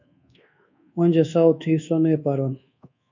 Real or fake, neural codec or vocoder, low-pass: fake; codec, 24 kHz, 1.2 kbps, DualCodec; 7.2 kHz